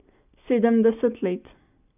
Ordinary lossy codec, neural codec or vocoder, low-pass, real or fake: none; none; 3.6 kHz; real